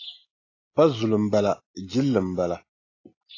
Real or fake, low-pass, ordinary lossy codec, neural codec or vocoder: real; 7.2 kHz; AAC, 32 kbps; none